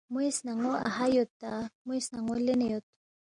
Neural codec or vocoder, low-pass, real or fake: none; 9.9 kHz; real